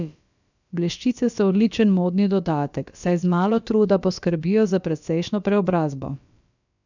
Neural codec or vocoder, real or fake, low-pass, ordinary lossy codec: codec, 16 kHz, about 1 kbps, DyCAST, with the encoder's durations; fake; 7.2 kHz; none